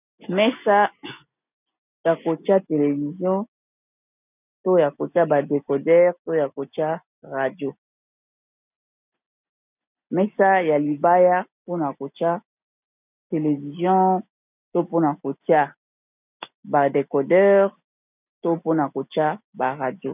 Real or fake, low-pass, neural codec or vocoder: real; 3.6 kHz; none